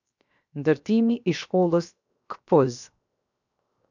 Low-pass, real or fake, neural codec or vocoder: 7.2 kHz; fake; codec, 16 kHz, 0.7 kbps, FocalCodec